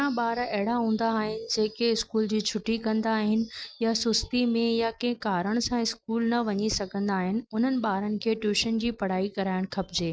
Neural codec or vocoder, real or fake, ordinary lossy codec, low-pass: none; real; none; none